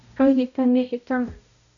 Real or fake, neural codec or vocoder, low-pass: fake; codec, 16 kHz, 0.5 kbps, X-Codec, HuBERT features, trained on balanced general audio; 7.2 kHz